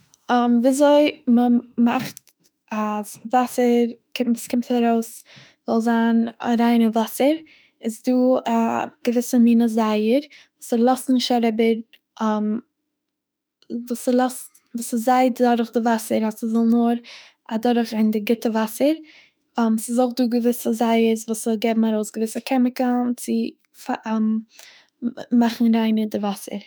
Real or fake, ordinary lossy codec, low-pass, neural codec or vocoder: fake; none; none; autoencoder, 48 kHz, 32 numbers a frame, DAC-VAE, trained on Japanese speech